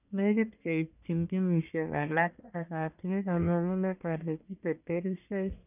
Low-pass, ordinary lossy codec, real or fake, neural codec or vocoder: 3.6 kHz; none; fake; codec, 44.1 kHz, 1.7 kbps, Pupu-Codec